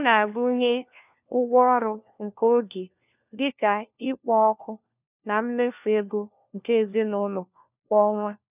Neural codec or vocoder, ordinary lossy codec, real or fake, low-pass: codec, 16 kHz, 1 kbps, FunCodec, trained on LibriTTS, 50 frames a second; none; fake; 3.6 kHz